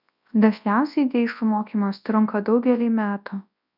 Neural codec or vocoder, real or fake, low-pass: codec, 24 kHz, 0.9 kbps, WavTokenizer, large speech release; fake; 5.4 kHz